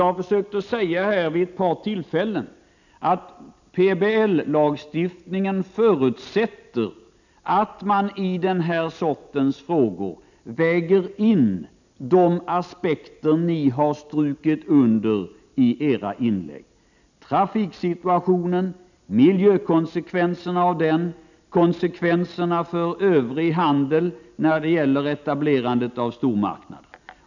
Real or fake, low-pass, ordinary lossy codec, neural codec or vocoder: real; 7.2 kHz; none; none